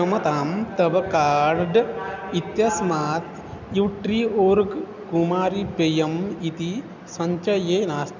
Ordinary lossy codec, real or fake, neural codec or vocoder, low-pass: none; real; none; 7.2 kHz